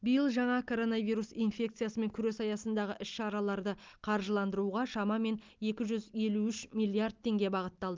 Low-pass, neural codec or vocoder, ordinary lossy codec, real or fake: 7.2 kHz; none; Opus, 24 kbps; real